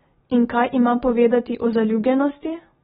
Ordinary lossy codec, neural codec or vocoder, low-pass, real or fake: AAC, 16 kbps; none; 19.8 kHz; real